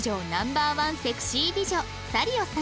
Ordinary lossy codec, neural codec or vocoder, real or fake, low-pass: none; none; real; none